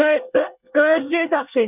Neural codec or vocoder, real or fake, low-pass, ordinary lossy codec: codec, 24 kHz, 1 kbps, SNAC; fake; 3.6 kHz; none